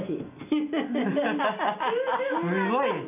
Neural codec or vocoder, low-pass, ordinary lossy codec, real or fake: none; 3.6 kHz; none; real